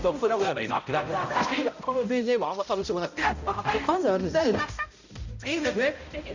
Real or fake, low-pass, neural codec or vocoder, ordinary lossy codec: fake; 7.2 kHz; codec, 16 kHz, 0.5 kbps, X-Codec, HuBERT features, trained on balanced general audio; Opus, 64 kbps